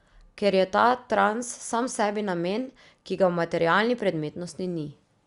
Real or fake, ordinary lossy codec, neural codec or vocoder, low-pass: real; Opus, 64 kbps; none; 10.8 kHz